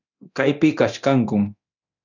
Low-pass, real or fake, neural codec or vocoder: 7.2 kHz; fake; codec, 24 kHz, 0.9 kbps, DualCodec